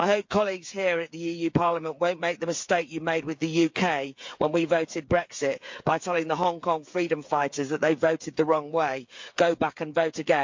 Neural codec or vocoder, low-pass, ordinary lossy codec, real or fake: codec, 16 kHz, 8 kbps, FreqCodec, smaller model; 7.2 kHz; MP3, 48 kbps; fake